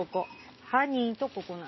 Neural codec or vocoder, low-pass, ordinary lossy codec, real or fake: none; 7.2 kHz; MP3, 24 kbps; real